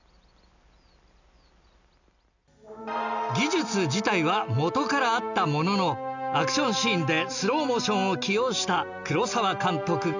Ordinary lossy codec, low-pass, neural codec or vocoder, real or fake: none; 7.2 kHz; none; real